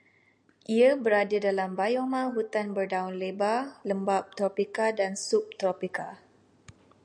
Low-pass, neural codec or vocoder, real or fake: 9.9 kHz; none; real